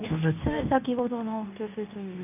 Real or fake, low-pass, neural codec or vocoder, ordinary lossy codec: fake; 3.6 kHz; codec, 24 kHz, 0.9 kbps, WavTokenizer, medium speech release version 2; none